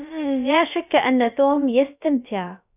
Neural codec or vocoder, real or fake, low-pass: codec, 16 kHz, about 1 kbps, DyCAST, with the encoder's durations; fake; 3.6 kHz